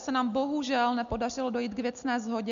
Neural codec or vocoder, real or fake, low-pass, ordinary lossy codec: none; real; 7.2 kHz; MP3, 64 kbps